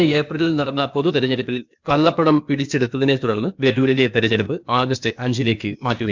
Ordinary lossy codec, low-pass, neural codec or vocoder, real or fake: MP3, 64 kbps; 7.2 kHz; codec, 16 kHz, 0.8 kbps, ZipCodec; fake